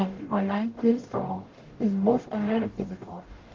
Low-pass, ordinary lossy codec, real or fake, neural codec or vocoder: 7.2 kHz; Opus, 16 kbps; fake; codec, 44.1 kHz, 0.9 kbps, DAC